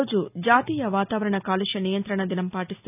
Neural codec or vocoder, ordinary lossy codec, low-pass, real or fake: none; none; 3.6 kHz; real